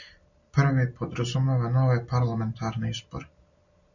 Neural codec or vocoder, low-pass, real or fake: none; 7.2 kHz; real